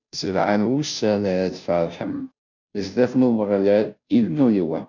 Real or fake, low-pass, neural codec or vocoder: fake; 7.2 kHz; codec, 16 kHz, 0.5 kbps, FunCodec, trained on Chinese and English, 25 frames a second